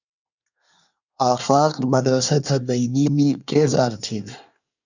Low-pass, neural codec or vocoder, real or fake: 7.2 kHz; codec, 24 kHz, 1 kbps, SNAC; fake